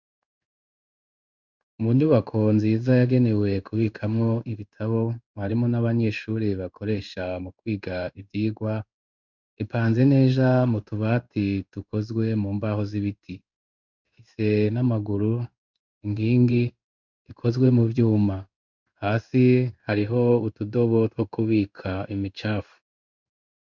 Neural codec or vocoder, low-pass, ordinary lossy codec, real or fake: codec, 16 kHz in and 24 kHz out, 1 kbps, XY-Tokenizer; 7.2 kHz; Opus, 64 kbps; fake